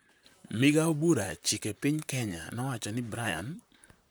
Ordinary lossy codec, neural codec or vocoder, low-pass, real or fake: none; vocoder, 44.1 kHz, 128 mel bands, Pupu-Vocoder; none; fake